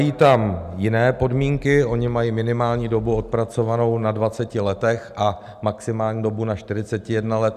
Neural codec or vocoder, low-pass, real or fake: none; 14.4 kHz; real